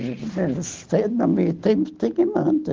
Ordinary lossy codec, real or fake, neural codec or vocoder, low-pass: Opus, 16 kbps; real; none; 7.2 kHz